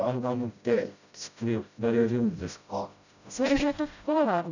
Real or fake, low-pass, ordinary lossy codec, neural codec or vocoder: fake; 7.2 kHz; none; codec, 16 kHz, 0.5 kbps, FreqCodec, smaller model